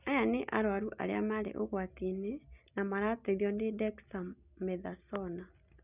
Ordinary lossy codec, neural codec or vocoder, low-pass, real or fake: none; none; 3.6 kHz; real